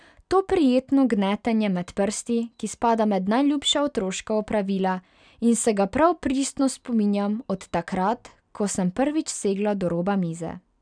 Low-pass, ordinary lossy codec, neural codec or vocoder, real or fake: 9.9 kHz; none; none; real